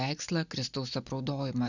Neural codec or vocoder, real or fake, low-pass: none; real; 7.2 kHz